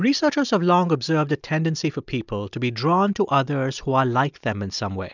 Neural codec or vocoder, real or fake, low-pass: none; real; 7.2 kHz